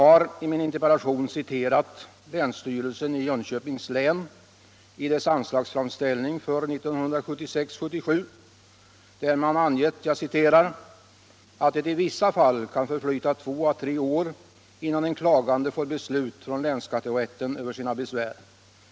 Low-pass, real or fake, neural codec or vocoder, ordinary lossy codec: none; real; none; none